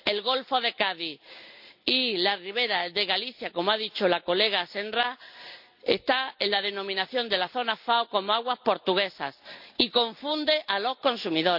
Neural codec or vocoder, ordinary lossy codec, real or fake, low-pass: none; none; real; 5.4 kHz